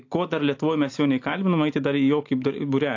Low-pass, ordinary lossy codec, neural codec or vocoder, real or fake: 7.2 kHz; AAC, 48 kbps; none; real